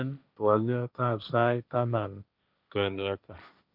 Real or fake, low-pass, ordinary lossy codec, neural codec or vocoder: fake; 5.4 kHz; none; codec, 16 kHz, 1.1 kbps, Voila-Tokenizer